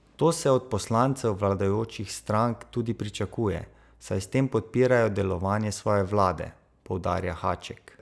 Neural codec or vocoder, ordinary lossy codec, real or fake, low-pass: none; none; real; none